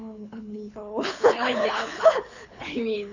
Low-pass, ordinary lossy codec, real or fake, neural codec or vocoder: 7.2 kHz; none; fake; codec, 24 kHz, 6 kbps, HILCodec